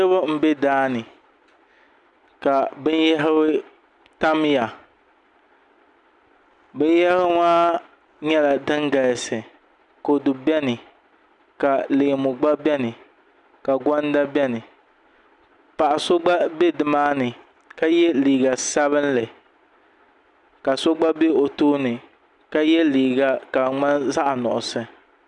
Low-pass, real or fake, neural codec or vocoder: 10.8 kHz; real; none